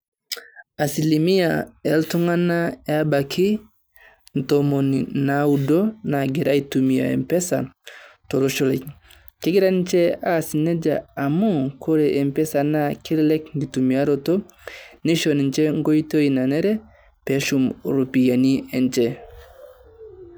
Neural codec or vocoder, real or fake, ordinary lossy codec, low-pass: none; real; none; none